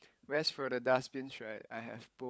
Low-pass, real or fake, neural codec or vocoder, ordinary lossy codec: none; fake; codec, 16 kHz, 16 kbps, FunCodec, trained on LibriTTS, 50 frames a second; none